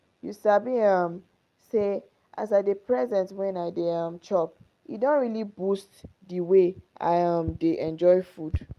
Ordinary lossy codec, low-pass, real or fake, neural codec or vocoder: Opus, 32 kbps; 14.4 kHz; real; none